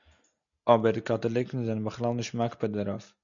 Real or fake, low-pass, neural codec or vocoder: real; 7.2 kHz; none